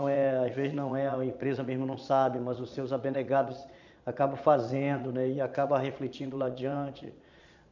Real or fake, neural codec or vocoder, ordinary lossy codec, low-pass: fake; vocoder, 22.05 kHz, 80 mel bands, Vocos; none; 7.2 kHz